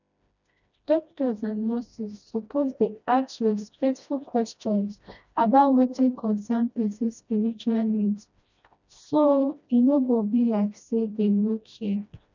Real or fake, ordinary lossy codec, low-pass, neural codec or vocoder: fake; none; 7.2 kHz; codec, 16 kHz, 1 kbps, FreqCodec, smaller model